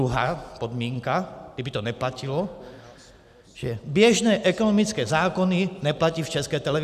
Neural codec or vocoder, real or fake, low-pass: none; real; 14.4 kHz